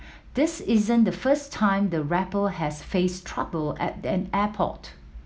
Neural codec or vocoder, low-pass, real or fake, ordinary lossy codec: none; none; real; none